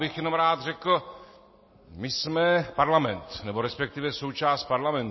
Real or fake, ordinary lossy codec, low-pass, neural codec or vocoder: real; MP3, 24 kbps; 7.2 kHz; none